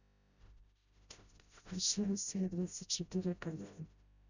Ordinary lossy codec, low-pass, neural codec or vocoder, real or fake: none; 7.2 kHz; codec, 16 kHz, 0.5 kbps, FreqCodec, smaller model; fake